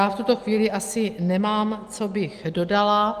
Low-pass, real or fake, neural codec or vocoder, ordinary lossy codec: 14.4 kHz; real; none; Opus, 24 kbps